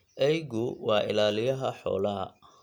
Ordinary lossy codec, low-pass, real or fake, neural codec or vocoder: none; 19.8 kHz; real; none